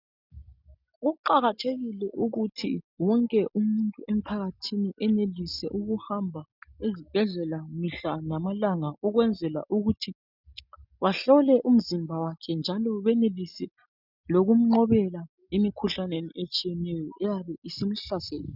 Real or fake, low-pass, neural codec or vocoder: real; 5.4 kHz; none